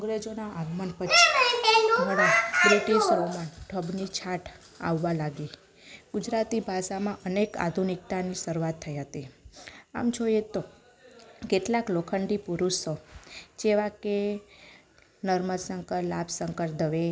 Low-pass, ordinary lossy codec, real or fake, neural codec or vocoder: none; none; real; none